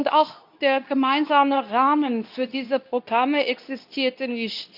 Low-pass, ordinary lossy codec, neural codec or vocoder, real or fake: 5.4 kHz; none; codec, 24 kHz, 0.9 kbps, WavTokenizer, medium speech release version 2; fake